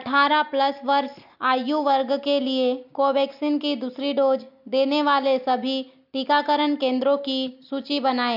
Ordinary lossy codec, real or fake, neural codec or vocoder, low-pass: MP3, 48 kbps; real; none; 5.4 kHz